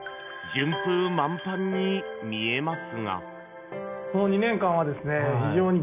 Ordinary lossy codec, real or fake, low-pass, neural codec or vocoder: none; real; 3.6 kHz; none